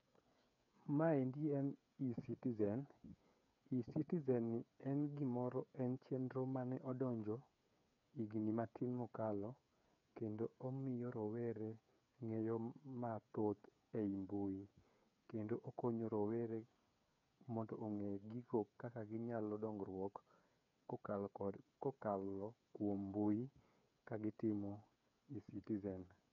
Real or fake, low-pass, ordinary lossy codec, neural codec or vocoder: fake; 7.2 kHz; MP3, 96 kbps; codec, 16 kHz, 8 kbps, FreqCodec, smaller model